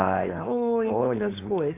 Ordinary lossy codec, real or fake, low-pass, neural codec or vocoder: AAC, 24 kbps; fake; 3.6 kHz; codec, 16 kHz, 4.8 kbps, FACodec